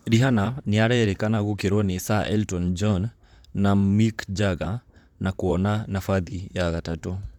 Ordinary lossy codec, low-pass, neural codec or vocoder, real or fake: none; 19.8 kHz; vocoder, 44.1 kHz, 128 mel bands, Pupu-Vocoder; fake